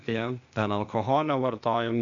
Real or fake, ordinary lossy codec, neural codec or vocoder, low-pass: fake; AAC, 64 kbps; codec, 16 kHz, 0.8 kbps, ZipCodec; 7.2 kHz